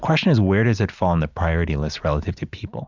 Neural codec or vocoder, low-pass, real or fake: none; 7.2 kHz; real